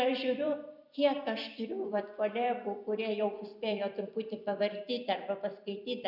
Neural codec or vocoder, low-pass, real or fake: vocoder, 44.1 kHz, 128 mel bands every 256 samples, BigVGAN v2; 5.4 kHz; fake